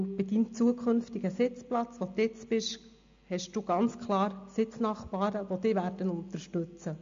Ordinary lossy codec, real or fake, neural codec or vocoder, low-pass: MP3, 48 kbps; real; none; 7.2 kHz